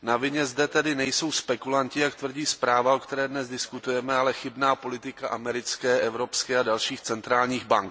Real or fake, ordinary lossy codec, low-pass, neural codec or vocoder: real; none; none; none